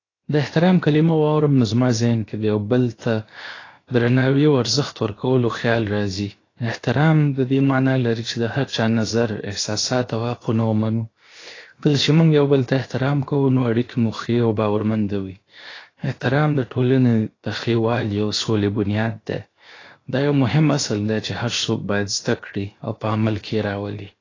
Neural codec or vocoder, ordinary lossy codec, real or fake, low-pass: codec, 16 kHz, 0.7 kbps, FocalCodec; AAC, 32 kbps; fake; 7.2 kHz